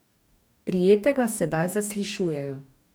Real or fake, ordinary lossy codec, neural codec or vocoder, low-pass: fake; none; codec, 44.1 kHz, 2.6 kbps, DAC; none